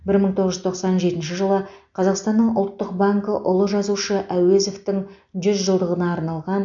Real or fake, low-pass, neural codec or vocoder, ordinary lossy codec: real; 7.2 kHz; none; AAC, 64 kbps